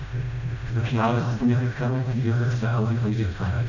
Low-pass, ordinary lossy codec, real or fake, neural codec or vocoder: 7.2 kHz; none; fake; codec, 16 kHz, 0.5 kbps, FreqCodec, smaller model